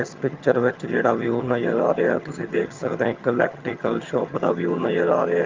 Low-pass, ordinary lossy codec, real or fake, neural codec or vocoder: 7.2 kHz; Opus, 32 kbps; fake; vocoder, 22.05 kHz, 80 mel bands, HiFi-GAN